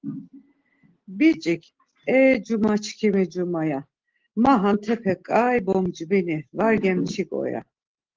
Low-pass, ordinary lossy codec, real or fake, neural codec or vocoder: 7.2 kHz; Opus, 16 kbps; real; none